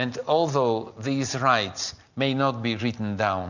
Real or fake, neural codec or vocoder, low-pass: real; none; 7.2 kHz